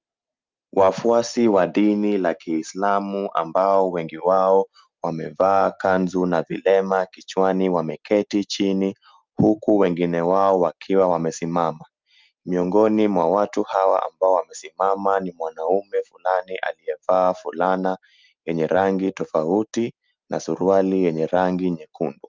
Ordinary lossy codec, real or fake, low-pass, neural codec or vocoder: Opus, 24 kbps; real; 7.2 kHz; none